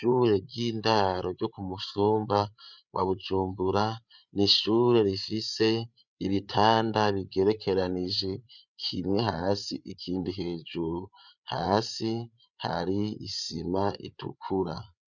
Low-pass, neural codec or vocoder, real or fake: 7.2 kHz; codec, 16 kHz, 8 kbps, FreqCodec, larger model; fake